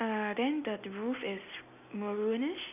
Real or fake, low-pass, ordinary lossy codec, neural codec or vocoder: real; 3.6 kHz; none; none